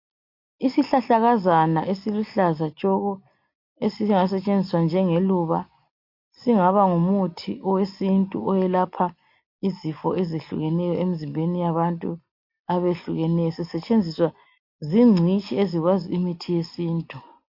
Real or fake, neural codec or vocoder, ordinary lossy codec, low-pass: real; none; MP3, 32 kbps; 5.4 kHz